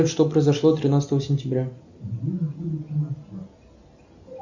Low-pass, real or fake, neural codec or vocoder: 7.2 kHz; real; none